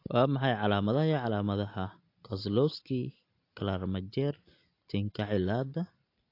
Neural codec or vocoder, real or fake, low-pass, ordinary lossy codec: none; real; 5.4 kHz; AAC, 32 kbps